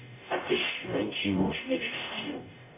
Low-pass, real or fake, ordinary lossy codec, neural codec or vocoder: 3.6 kHz; fake; MP3, 24 kbps; codec, 44.1 kHz, 0.9 kbps, DAC